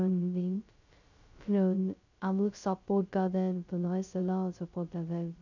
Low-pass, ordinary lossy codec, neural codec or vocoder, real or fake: 7.2 kHz; none; codec, 16 kHz, 0.2 kbps, FocalCodec; fake